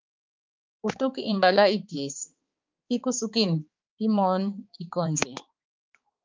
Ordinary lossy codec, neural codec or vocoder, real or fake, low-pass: Opus, 32 kbps; codec, 16 kHz, 4 kbps, X-Codec, HuBERT features, trained on balanced general audio; fake; 7.2 kHz